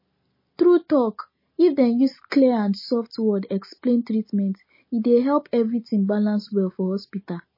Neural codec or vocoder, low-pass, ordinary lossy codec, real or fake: none; 5.4 kHz; MP3, 24 kbps; real